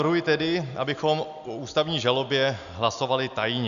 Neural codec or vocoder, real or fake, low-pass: none; real; 7.2 kHz